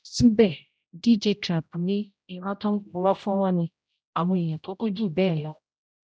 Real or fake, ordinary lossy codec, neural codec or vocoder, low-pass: fake; none; codec, 16 kHz, 0.5 kbps, X-Codec, HuBERT features, trained on general audio; none